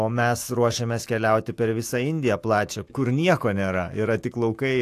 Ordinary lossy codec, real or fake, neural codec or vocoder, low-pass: AAC, 64 kbps; fake; autoencoder, 48 kHz, 128 numbers a frame, DAC-VAE, trained on Japanese speech; 14.4 kHz